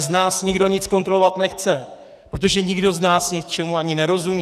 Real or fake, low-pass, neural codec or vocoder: fake; 14.4 kHz; codec, 44.1 kHz, 2.6 kbps, SNAC